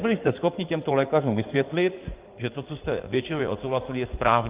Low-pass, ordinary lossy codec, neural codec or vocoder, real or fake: 3.6 kHz; Opus, 24 kbps; codec, 16 kHz in and 24 kHz out, 2.2 kbps, FireRedTTS-2 codec; fake